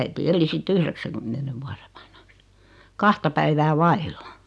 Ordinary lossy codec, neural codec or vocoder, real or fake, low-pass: none; none; real; none